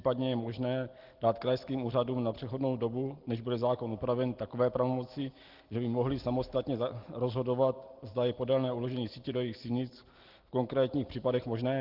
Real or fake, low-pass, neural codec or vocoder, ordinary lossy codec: real; 5.4 kHz; none; Opus, 16 kbps